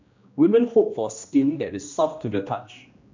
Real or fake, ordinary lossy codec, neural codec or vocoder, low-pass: fake; MP3, 64 kbps; codec, 16 kHz, 1 kbps, X-Codec, HuBERT features, trained on general audio; 7.2 kHz